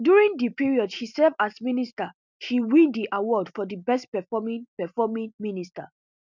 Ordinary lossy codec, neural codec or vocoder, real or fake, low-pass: none; none; real; 7.2 kHz